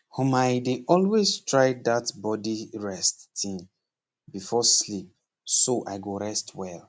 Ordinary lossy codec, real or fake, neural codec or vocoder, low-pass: none; real; none; none